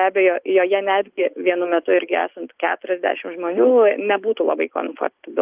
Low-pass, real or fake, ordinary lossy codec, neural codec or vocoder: 3.6 kHz; real; Opus, 24 kbps; none